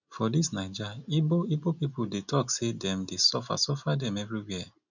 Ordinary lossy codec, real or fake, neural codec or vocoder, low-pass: none; real; none; 7.2 kHz